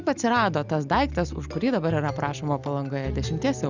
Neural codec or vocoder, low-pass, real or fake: none; 7.2 kHz; real